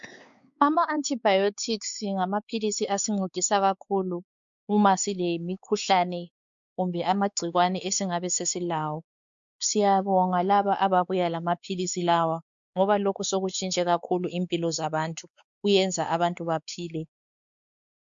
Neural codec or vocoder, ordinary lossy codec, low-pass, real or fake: codec, 16 kHz, 4 kbps, X-Codec, WavLM features, trained on Multilingual LibriSpeech; MP3, 64 kbps; 7.2 kHz; fake